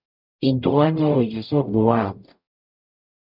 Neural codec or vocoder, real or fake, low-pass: codec, 44.1 kHz, 0.9 kbps, DAC; fake; 5.4 kHz